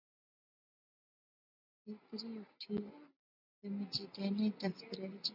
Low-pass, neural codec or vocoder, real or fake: 5.4 kHz; none; real